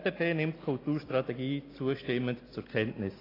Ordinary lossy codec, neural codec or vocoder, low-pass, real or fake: AAC, 24 kbps; none; 5.4 kHz; real